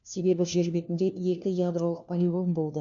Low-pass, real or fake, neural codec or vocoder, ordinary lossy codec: 7.2 kHz; fake; codec, 16 kHz, 1 kbps, FunCodec, trained on LibriTTS, 50 frames a second; none